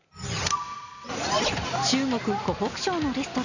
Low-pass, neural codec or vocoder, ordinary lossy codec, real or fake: 7.2 kHz; none; none; real